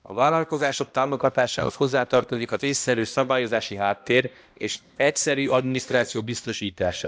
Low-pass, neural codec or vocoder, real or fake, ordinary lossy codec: none; codec, 16 kHz, 1 kbps, X-Codec, HuBERT features, trained on balanced general audio; fake; none